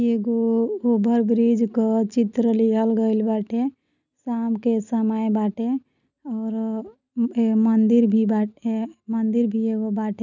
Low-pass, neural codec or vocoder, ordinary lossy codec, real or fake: 7.2 kHz; none; none; real